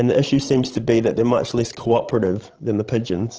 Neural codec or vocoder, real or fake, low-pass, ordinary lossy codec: codec, 16 kHz, 16 kbps, FreqCodec, larger model; fake; 7.2 kHz; Opus, 16 kbps